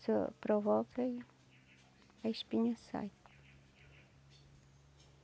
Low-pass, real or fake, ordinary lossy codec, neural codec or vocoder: none; real; none; none